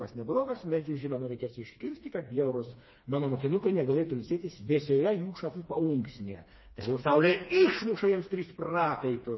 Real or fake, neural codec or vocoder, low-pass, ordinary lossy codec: fake; codec, 16 kHz, 2 kbps, FreqCodec, smaller model; 7.2 kHz; MP3, 24 kbps